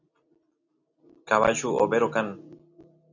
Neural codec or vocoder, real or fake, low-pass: none; real; 7.2 kHz